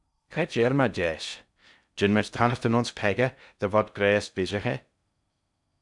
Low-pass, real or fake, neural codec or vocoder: 10.8 kHz; fake; codec, 16 kHz in and 24 kHz out, 0.6 kbps, FocalCodec, streaming, 4096 codes